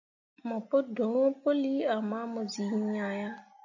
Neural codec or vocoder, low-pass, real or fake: none; 7.2 kHz; real